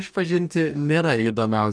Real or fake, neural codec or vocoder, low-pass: fake; codec, 44.1 kHz, 2.6 kbps, DAC; 9.9 kHz